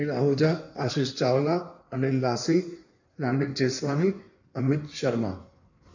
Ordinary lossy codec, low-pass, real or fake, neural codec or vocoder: none; 7.2 kHz; fake; codec, 16 kHz in and 24 kHz out, 1.1 kbps, FireRedTTS-2 codec